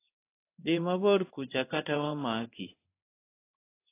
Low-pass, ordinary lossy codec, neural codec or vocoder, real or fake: 3.6 kHz; AAC, 24 kbps; codec, 16 kHz in and 24 kHz out, 1 kbps, XY-Tokenizer; fake